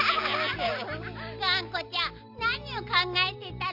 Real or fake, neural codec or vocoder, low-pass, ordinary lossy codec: real; none; 5.4 kHz; none